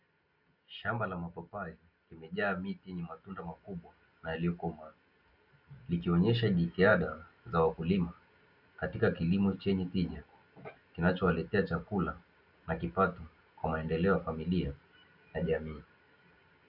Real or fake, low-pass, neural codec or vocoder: real; 5.4 kHz; none